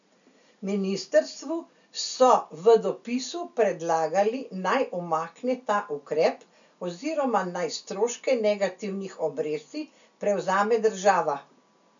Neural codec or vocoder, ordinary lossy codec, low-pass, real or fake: none; none; 7.2 kHz; real